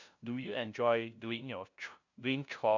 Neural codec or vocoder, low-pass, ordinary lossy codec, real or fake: codec, 16 kHz, 0.5 kbps, FunCodec, trained on LibriTTS, 25 frames a second; 7.2 kHz; none; fake